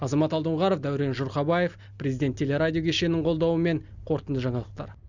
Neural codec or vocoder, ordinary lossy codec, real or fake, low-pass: none; none; real; 7.2 kHz